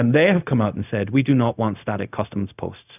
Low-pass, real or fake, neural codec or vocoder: 3.6 kHz; fake; codec, 16 kHz, 0.4 kbps, LongCat-Audio-Codec